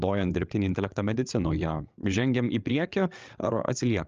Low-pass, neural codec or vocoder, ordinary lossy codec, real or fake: 7.2 kHz; codec, 16 kHz, 8 kbps, FreqCodec, larger model; Opus, 24 kbps; fake